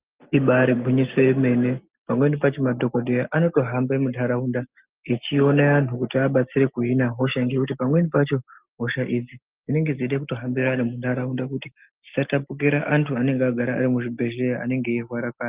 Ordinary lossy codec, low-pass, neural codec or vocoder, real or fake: Opus, 24 kbps; 3.6 kHz; none; real